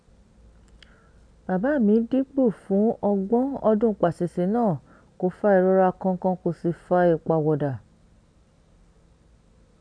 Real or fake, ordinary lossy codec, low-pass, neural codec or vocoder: real; none; 9.9 kHz; none